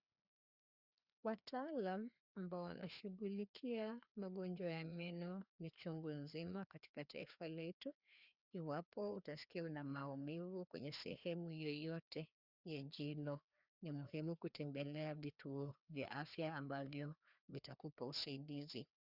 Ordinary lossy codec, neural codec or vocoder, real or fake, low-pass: Opus, 64 kbps; codec, 16 kHz, 2 kbps, FreqCodec, larger model; fake; 5.4 kHz